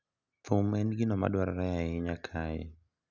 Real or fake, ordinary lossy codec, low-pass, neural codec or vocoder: real; none; 7.2 kHz; none